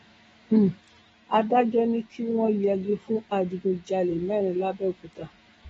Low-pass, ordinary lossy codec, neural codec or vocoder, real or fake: 19.8 kHz; AAC, 24 kbps; autoencoder, 48 kHz, 128 numbers a frame, DAC-VAE, trained on Japanese speech; fake